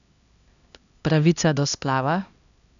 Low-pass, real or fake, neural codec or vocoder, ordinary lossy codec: 7.2 kHz; fake; codec, 16 kHz, 1 kbps, X-Codec, HuBERT features, trained on LibriSpeech; none